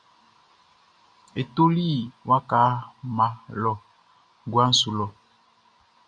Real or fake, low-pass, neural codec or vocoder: real; 9.9 kHz; none